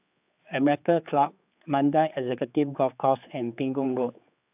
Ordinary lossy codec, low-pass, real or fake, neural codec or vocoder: AAC, 32 kbps; 3.6 kHz; fake; codec, 16 kHz, 4 kbps, X-Codec, HuBERT features, trained on general audio